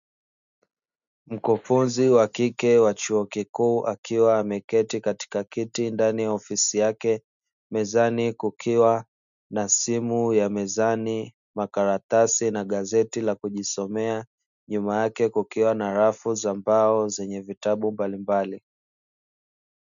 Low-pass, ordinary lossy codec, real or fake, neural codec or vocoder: 7.2 kHz; MP3, 96 kbps; real; none